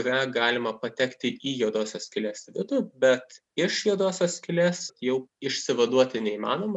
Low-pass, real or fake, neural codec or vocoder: 10.8 kHz; real; none